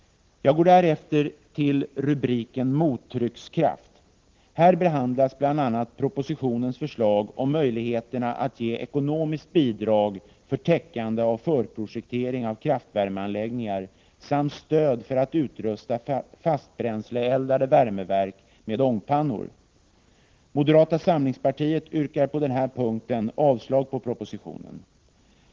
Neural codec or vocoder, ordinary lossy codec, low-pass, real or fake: none; Opus, 16 kbps; 7.2 kHz; real